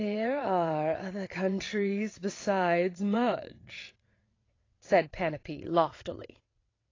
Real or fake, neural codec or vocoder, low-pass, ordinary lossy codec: fake; vocoder, 22.05 kHz, 80 mel bands, WaveNeXt; 7.2 kHz; AAC, 32 kbps